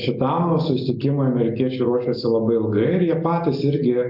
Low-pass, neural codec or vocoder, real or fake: 5.4 kHz; none; real